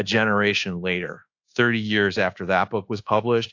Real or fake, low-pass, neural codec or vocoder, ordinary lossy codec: fake; 7.2 kHz; codec, 24 kHz, 1.2 kbps, DualCodec; AAC, 48 kbps